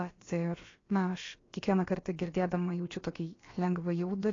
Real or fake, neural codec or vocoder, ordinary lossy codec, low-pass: fake; codec, 16 kHz, about 1 kbps, DyCAST, with the encoder's durations; AAC, 32 kbps; 7.2 kHz